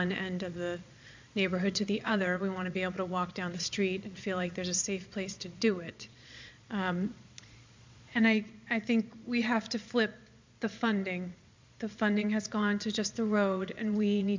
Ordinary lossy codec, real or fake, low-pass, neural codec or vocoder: MP3, 64 kbps; fake; 7.2 kHz; vocoder, 22.05 kHz, 80 mel bands, Vocos